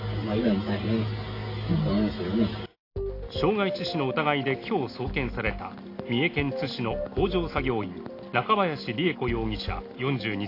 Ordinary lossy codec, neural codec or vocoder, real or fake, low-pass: AAC, 32 kbps; none; real; 5.4 kHz